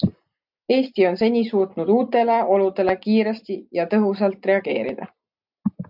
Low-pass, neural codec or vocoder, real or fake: 5.4 kHz; none; real